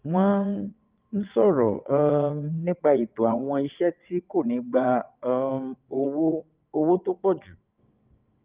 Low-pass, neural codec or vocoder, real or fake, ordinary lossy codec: 3.6 kHz; vocoder, 22.05 kHz, 80 mel bands, WaveNeXt; fake; Opus, 24 kbps